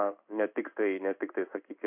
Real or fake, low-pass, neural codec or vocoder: fake; 3.6 kHz; codec, 16 kHz in and 24 kHz out, 1 kbps, XY-Tokenizer